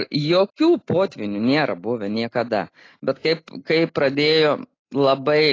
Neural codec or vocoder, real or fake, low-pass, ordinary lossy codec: none; real; 7.2 kHz; AAC, 32 kbps